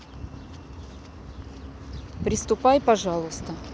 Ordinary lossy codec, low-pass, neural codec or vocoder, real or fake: none; none; none; real